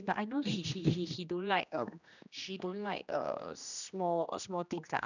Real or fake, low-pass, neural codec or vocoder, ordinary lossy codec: fake; 7.2 kHz; codec, 16 kHz, 1 kbps, X-Codec, HuBERT features, trained on general audio; none